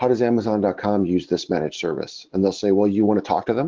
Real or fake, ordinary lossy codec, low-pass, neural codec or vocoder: real; Opus, 16 kbps; 7.2 kHz; none